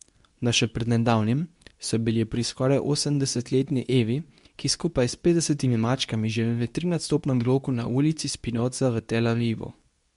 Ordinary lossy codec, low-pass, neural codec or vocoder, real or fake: MP3, 64 kbps; 10.8 kHz; codec, 24 kHz, 0.9 kbps, WavTokenizer, medium speech release version 2; fake